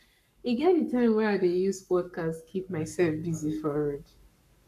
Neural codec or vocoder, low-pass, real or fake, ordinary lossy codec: codec, 44.1 kHz, 7.8 kbps, Pupu-Codec; 14.4 kHz; fake; none